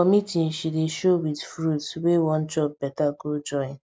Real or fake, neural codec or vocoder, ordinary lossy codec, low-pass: real; none; none; none